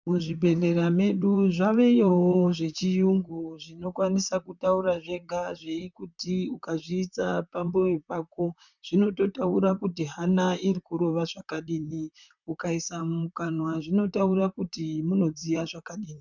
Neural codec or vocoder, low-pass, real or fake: vocoder, 44.1 kHz, 128 mel bands, Pupu-Vocoder; 7.2 kHz; fake